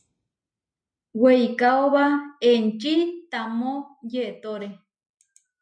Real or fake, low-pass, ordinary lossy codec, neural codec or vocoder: real; 9.9 kHz; MP3, 64 kbps; none